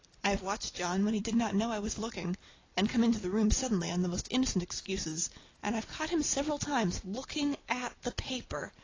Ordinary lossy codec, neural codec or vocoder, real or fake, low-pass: AAC, 32 kbps; none; real; 7.2 kHz